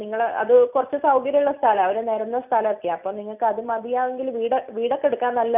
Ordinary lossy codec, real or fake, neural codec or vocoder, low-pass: none; real; none; 3.6 kHz